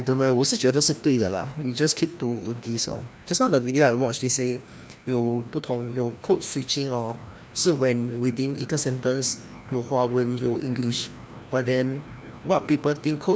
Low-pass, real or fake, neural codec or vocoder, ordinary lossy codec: none; fake; codec, 16 kHz, 1 kbps, FreqCodec, larger model; none